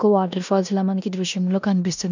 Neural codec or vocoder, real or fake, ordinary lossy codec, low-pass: codec, 16 kHz in and 24 kHz out, 0.9 kbps, LongCat-Audio-Codec, four codebook decoder; fake; none; 7.2 kHz